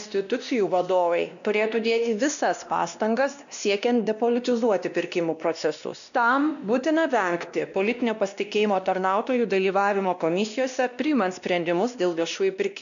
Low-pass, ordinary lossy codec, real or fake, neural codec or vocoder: 7.2 kHz; MP3, 96 kbps; fake; codec, 16 kHz, 1 kbps, X-Codec, WavLM features, trained on Multilingual LibriSpeech